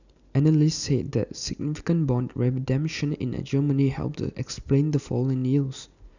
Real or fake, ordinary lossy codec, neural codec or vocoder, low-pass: real; none; none; 7.2 kHz